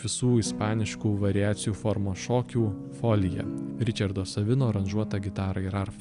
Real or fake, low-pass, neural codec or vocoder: real; 10.8 kHz; none